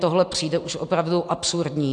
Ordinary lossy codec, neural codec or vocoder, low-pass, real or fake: AAC, 64 kbps; none; 10.8 kHz; real